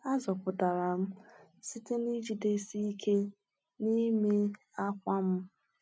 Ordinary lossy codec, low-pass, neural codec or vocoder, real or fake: none; none; none; real